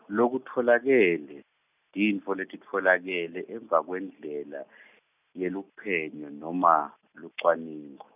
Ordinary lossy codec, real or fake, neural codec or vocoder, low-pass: none; real; none; 3.6 kHz